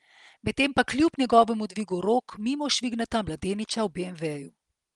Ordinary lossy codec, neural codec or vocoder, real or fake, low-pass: Opus, 24 kbps; none; real; 10.8 kHz